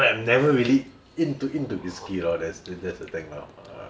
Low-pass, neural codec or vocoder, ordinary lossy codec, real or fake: none; none; none; real